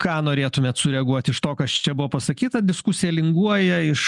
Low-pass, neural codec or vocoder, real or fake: 10.8 kHz; none; real